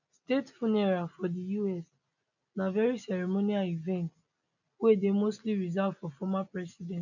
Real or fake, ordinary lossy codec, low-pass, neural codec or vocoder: real; none; 7.2 kHz; none